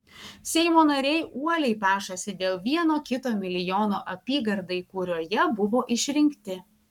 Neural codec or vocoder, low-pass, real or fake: codec, 44.1 kHz, 7.8 kbps, Pupu-Codec; 19.8 kHz; fake